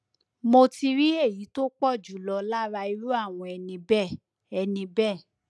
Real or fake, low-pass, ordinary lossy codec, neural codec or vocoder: real; none; none; none